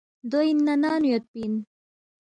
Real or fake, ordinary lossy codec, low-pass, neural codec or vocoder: real; MP3, 96 kbps; 9.9 kHz; none